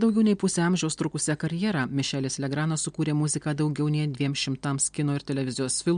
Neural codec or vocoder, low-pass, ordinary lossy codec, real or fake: none; 9.9 kHz; MP3, 64 kbps; real